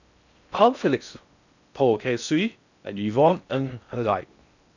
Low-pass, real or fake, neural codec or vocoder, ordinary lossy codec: 7.2 kHz; fake; codec, 16 kHz in and 24 kHz out, 0.6 kbps, FocalCodec, streaming, 4096 codes; none